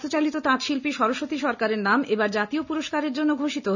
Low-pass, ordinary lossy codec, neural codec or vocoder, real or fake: 7.2 kHz; none; none; real